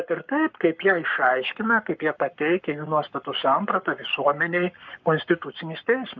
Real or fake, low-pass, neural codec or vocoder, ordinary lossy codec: fake; 7.2 kHz; codec, 44.1 kHz, 7.8 kbps, Pupu-Codec; MP3, 64 kbps